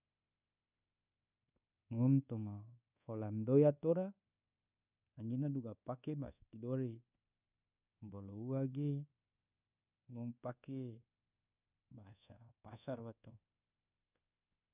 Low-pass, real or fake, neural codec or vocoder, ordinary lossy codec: 3.6 kHz; fake; codec, 24 kHz, 1.2 kbps, DualCodec; none